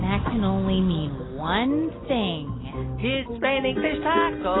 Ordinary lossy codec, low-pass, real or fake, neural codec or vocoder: AAC, 16 kbps; 7.2 kHz; real; none